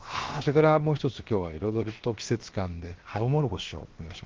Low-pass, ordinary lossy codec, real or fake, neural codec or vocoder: 7.2 kHz; Opus, 16 kbps; fake; codec, 16 kHz, 0.7 kbps, FocalCodec